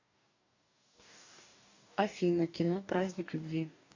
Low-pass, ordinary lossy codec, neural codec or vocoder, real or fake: 7.2 kHz; AAC, 48 kbps; codec, 44.1 kHz, 2.6 kbps, DAC; fake